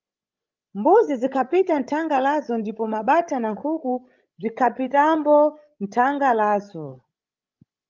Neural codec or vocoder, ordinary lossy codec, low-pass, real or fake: codec, 16 kHz, 16 kbps, FreqCodec, larger model; Opus, 32 kbps; 7.2 kHz; fake